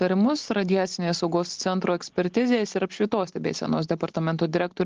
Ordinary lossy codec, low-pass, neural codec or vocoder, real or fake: Opus, 16 kbps; 7.2 kHz; none; real